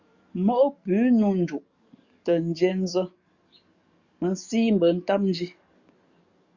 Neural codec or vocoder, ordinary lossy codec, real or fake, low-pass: codec, 44.1 kHz, 7.8 kbps, DAC; Opus, 64 kbps; fake; 7.2 kHz